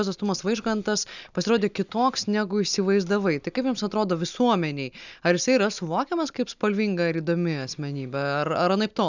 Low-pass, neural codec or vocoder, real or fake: 7.2 kHz; none; real